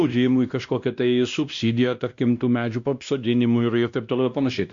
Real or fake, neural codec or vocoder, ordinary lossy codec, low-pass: fake; codec, 16 kHz, 1 kbps, X-Codec, WavLM features, trained on Multilingual LibriSpeech; Opus, 64 kbps; 7.2 kHz